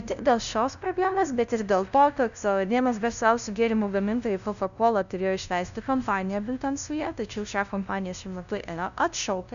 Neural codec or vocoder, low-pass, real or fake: codec, 16 kHz, 0.5 kbps, FunCodec, trained on LibriTTS, 25 frames a second; 7.2 kHz; fake